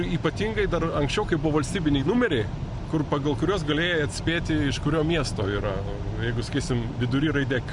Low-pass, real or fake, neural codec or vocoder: 10.8 kHz; real; none